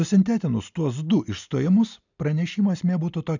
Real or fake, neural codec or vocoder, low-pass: real; none; 7.2 kHz